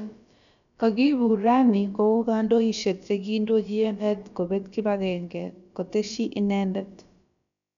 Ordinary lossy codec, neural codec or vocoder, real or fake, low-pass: none; codec, 16 kHz, about 1 kbps, DyCAST, with the encoder's durations; fake; 7.2 kHz